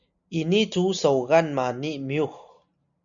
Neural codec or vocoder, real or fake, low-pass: none; real; 7.2 kHz